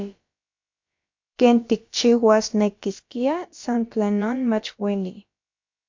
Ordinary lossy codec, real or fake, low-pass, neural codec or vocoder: MP3, 48 kbps; fake; 7.2 kHz; codec, 16 kHz, about 1 kbps, DyCAST, with the encoder's durations